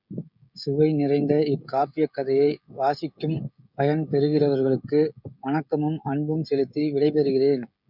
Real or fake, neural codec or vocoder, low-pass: fake; codec, 16 kHz, 16 kbps, FreqCodec, smaller model; 5.4 kHz